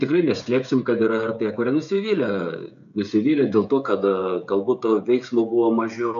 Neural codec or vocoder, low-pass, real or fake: codec, 16 kHz, 16 kbps, FreqCodec, smaller model; 7.2 kHz; fake